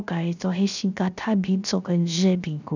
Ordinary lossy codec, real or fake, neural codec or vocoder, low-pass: none; fake; codec, 16 kHz, 0.3 kbps, FocalCodec; 7.2 kHz